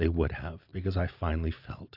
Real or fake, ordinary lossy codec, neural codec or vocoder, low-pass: real; AAC, 48 kbps; none; 5.4 kHz